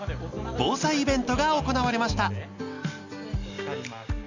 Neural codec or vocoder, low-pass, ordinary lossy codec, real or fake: none; 7.2 kHz; Opus, 64 kbps; real